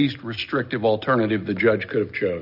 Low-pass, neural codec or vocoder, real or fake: 5.4 kHz; none; real